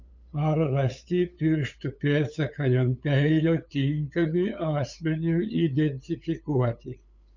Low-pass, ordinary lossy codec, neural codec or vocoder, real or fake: 7.2 kHz; MP3, 64 kbps; codec, 16 kHz, 8 kbps, FunCodec, trained on LibriTTS, 25 frames a second; fake